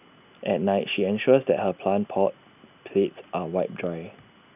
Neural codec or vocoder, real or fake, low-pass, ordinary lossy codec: none; real; 3.6 kHz; none